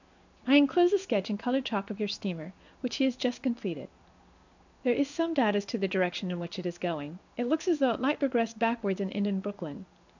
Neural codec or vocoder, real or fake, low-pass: codec, 16 kHz in and 24 kHz out, 1 kbps, XY-Tokenizer; fake; 7.2 kHz